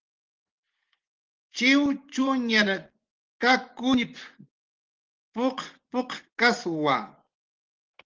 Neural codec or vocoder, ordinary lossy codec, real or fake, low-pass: vocoder, 24 kHz, 100 mel bands, Vocos; Opus, 16 kbps; fake; 7.2 kHz